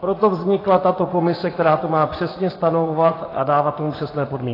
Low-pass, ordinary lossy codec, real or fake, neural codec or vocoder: 5.4 kHz; AAC, 24 kbps; fake; vocoder, 24 kHz, 100 mel bands, Vocos